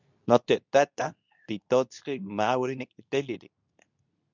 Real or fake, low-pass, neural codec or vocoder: fake; 7.2 kHz; codec, 24 kHz, 0.9 kbps, WavTokenizer, medium speech release version 2